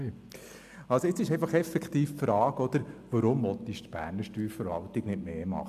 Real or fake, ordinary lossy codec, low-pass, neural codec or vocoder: real; AAC, 96 kbps; 14.4 kHz; none